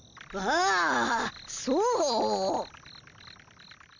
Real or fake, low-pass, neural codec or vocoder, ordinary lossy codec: real; 7.2 kHz; none; none